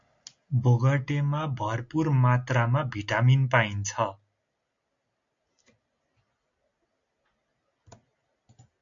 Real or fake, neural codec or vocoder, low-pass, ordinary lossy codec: real; none; 7.2 kHz; AAC, 64 kbps